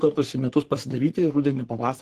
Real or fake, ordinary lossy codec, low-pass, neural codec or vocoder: fake; Opus, 16 kbps; 14.4 kHz; codec, 44.1 kHz, 3.4 kbps, Pupu-Codec